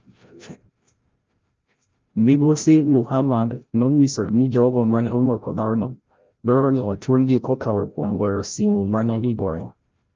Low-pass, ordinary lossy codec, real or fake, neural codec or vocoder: 7.2 kHz; Opus, 24 kbps; fake; codec, 16 kHz, 0.5 kbps, FreqCodec, larger model